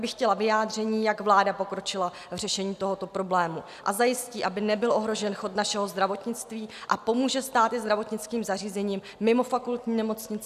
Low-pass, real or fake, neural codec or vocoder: 14.4 kHz; real; none